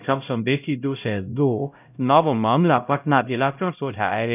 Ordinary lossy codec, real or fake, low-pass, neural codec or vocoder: none; fake; 3.6 kHz; codec, 16 kHz, 0.5 kbps, X-Codec, HuBERT features, trained on LibriSpeech